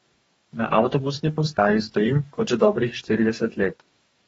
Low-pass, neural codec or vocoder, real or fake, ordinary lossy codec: 19.8 kHz; codec, 44.1 kHz, 2.6 kbps, DAC; fake; AAC, 24 kbps